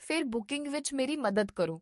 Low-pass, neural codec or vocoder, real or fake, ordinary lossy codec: 14.4 kHz; codec, 44.1 kHz, 7.8 kbps, DAC; fake; MP3, 48 kbps